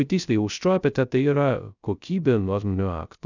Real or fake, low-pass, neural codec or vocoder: fake; 7.2 kHz; codec, 16 kHz, 0.2 kbps, FocalCodec